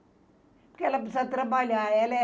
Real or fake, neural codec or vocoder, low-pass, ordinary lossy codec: real; none; none; none